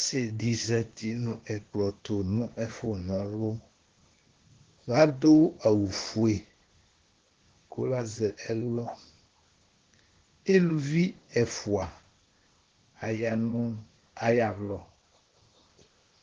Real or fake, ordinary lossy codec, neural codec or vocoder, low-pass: fake; Opus, 16 kbps; codec, 16 kHz, 0.8 kbps, ZipCodec; 7.2 kHz